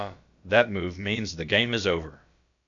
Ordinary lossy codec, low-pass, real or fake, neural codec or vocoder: AAC, 64 kbps; 7.2 kHz; fake; codec, 16 kHz, about 1 kbps, DyCAST, with the encoder's durations